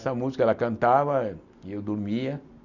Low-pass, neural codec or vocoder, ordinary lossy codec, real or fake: 7.2 kHz; none; none; real